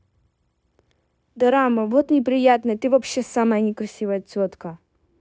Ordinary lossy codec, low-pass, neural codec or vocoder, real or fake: none; none; codec, 16 kHz, 0.9 kbps, LongCat-Audio-Codec; fake